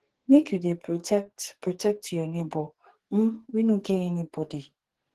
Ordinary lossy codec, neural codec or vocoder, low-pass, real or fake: Opus, 16 kbps; codec, 44.1 kHz, 2.6 kbps, SNAC; 14.4 kHz; fake